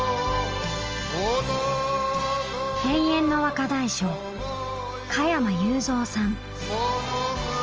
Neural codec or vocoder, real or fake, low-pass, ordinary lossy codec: none; real; 7.2 kHz; Opus, 24 kbps